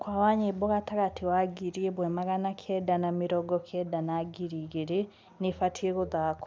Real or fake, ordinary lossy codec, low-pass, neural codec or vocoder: real; none; none; none